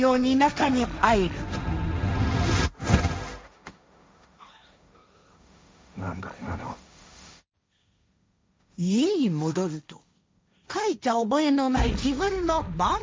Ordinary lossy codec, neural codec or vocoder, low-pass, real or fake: none; codec, 16 kHz, 1.1 kbps, Voila-Tokenizer; none; fake